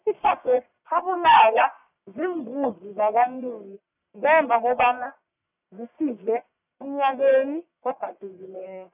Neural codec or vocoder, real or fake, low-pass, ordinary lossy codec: codec, 44.1 kHz, 1.7 kbps, Pupu-Codec; fake; 3.6 kHz; none